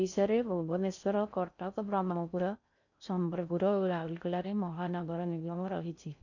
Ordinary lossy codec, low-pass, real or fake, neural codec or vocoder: none; 7.2 kHz; fake; codec, 16 kHz in and 24 kHz out, 0.6 kbps, FocalCodec, streaming, 4096 codes